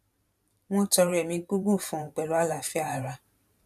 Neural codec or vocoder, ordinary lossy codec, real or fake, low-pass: vocoder, 44.1 kHz, 128 mel bands every 512 samples, BigVGAN v2; none; fake; 14.4 kHz